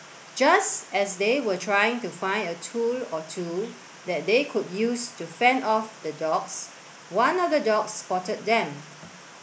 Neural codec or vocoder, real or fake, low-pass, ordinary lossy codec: none; real; none; none